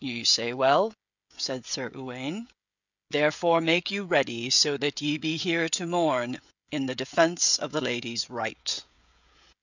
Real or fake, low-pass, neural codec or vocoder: fake; 7.2 kHz; codec, 16 kHz, 16 kbps, FreqCodec, smaller model